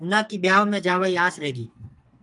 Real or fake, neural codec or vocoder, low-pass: fake; codec, 44.1 kHz, 2.6 kbps, SNAC; 10.8 kHz